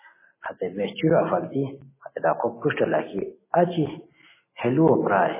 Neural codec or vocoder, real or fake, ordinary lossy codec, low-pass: none; real; MP3, 16 kbps; 3.6 kHz